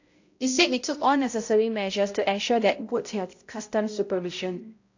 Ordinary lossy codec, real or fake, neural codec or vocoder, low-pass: AAC, 48 kbps; fake; codec, 16 kHz, 0.5 kbps, X-Codec, HuBERT features, trained on balanced general audio; 7.2 kHz